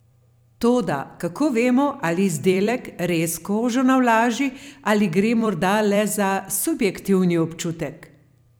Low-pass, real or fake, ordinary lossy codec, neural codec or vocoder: none; fake; none; vocoder, 44.1 kHz, 128 mel bands every 256 samples, BigVGAN v2